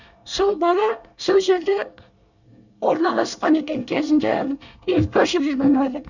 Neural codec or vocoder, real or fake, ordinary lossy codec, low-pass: codec, 24 kHz, 1 kbps, SNAC; fake; none; 7.2 kHz